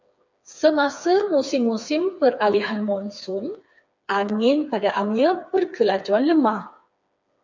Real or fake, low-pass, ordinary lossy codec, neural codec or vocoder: fake; 7.2 kHz; MP3, 64 kbps; codec, 16 kHz, 4 kbps, FreqCodec, smaller model